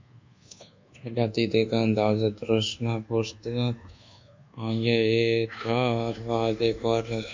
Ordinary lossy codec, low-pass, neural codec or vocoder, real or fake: MP3, 64 kbps; 7.2 kHz; codec, 24 kHz, 1.2 kbps, DualCodec; fake